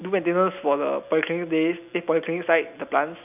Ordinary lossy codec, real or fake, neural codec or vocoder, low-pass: none; real; none; 3.6 kHz